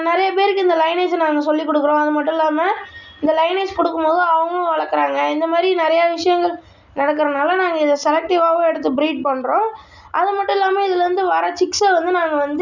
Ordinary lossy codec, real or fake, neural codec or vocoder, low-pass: none; real; none; 7.2 kHz